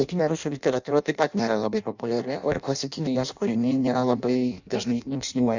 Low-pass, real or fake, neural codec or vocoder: 7.2 kHz; fake; codec, 16 kHz in and 24 kHz out, 0.6 kbps, FireRedTTS-2 codec